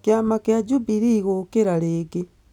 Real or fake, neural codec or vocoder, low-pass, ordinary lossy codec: real; none; 19.8 kHz; none